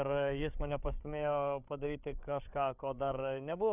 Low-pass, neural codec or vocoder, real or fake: 3.6 kHz; codec, 16 kHz, 8 kbps, FreqCodec, larger model; fake